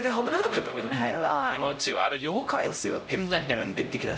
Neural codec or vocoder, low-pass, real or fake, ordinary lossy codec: codec, 16 kHz, 0.5 kbps, X-Codec, HuBERT features, trained on LibriSpeech; none; fake; none